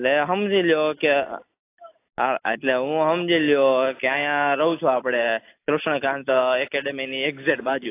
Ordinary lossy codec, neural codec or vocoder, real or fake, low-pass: AAC, 24 kbps; none; real; 3.6 kHz